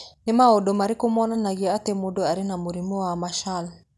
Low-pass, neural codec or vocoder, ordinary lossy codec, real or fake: none; none; none; real